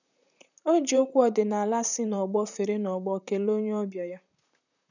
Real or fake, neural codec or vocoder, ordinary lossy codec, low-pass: fake; vocoder, 44.1 kHz, 128 mel bands, Pupu-Vocoder; none; 7.2 kHz